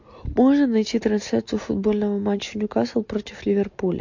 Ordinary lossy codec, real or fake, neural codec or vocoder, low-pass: MP3, 48 kbps; real; none; 7.2 kHz